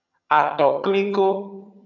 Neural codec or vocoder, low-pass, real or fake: vocoder, 22.05 kHz, 80 mel bands, HiFi-GAN; 7.2 kHz; fake